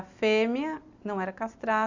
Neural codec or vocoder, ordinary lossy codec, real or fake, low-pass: none; Opus, 64 kbps; real; 7.2 kHz